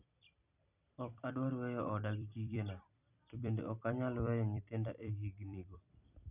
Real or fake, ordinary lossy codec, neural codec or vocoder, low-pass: fake; none; vocoder, 44.1 kHz, 128 mel bands every 256 samples, BigVGAN v2; 3.6 kHz